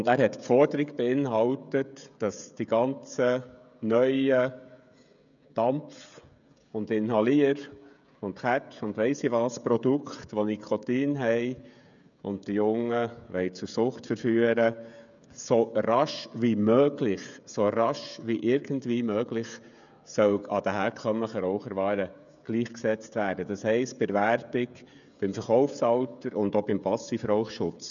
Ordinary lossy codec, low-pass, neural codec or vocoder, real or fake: none; 7.2 kHz; codec, 16 kHz, 16 kbps, FreqCodec, smaller model; fake